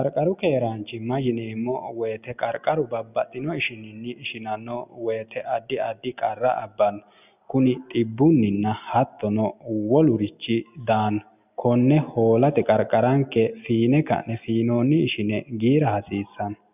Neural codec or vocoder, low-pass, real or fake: none; 3.6 kHz; real